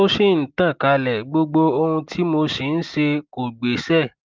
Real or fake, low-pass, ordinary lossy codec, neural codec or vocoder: real; 7.2 kHz; Opus, 32 kbps; none